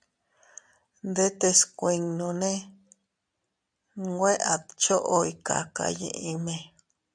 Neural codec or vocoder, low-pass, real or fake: none; 9.9 kHz; real